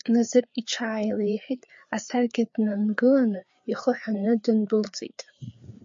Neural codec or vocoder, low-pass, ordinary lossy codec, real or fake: codec, 16 kHz, 4 kbps, FreqCodec, larger model; 7.2 kHz; MP3, 48 kbps; fake